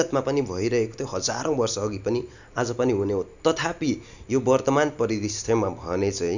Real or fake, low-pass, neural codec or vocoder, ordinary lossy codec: real; 7.2 kHz; none; none